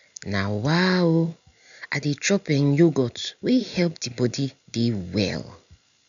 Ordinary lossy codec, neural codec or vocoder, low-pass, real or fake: none; none; 7.2 kHz; real